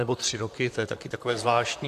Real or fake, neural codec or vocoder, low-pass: fake; vocoder, 44.1 kHz, 128 mel bands, Pupu-Vocoder; 14.4 kHz